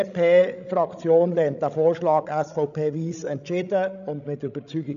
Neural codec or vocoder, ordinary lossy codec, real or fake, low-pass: codec, 16 kHz, 16 kbps, FreqCodec, larger model; none; fake; 7.2 kHz